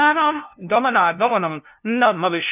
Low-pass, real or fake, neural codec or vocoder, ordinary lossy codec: 3.6 kHz; fake; codec, 16 kHz, 0.5 kbps, FunCodec, trained on LibriTTS, 25 frames a second; none